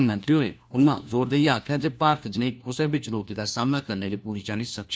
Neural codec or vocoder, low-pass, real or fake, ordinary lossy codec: codec, 16 kHz, 1 kbps, FunCodec, trained on LibriTTS, 50 frames a second; none; fake; none